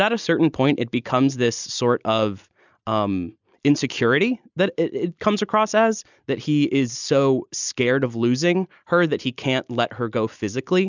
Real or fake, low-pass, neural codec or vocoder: real; 7.2 kHz; none